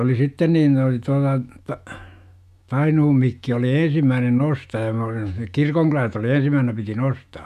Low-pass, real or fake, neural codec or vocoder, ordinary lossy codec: 14.4 kHz; fake; autoencoder, 48 kHz, 128 numbers a frame, DAC-VAE, trained on Japanese speech; none